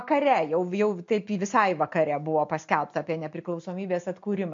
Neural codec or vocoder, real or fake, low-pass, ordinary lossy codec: none; real; 7.2 kHz; MP3, 48 kbps